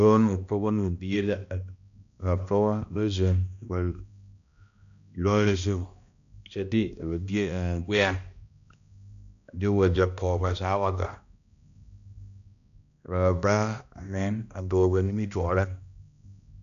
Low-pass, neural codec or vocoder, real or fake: 7.2 kHz; codec, 16 kHz, 1 kbps, X-Codec, HuBERT features, trained on balanced general audio; fake